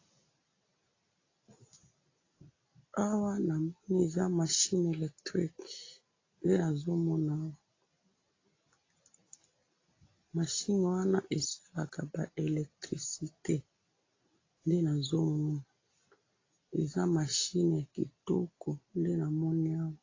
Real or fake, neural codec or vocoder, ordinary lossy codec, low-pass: real; none; AAC, 32 kbps; 7.2 kHz